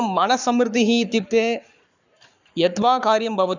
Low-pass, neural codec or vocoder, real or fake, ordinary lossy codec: 7.2 kHz; codec, 44.1 kHz, 7.8 kbps, Pupu-Codec; fake; none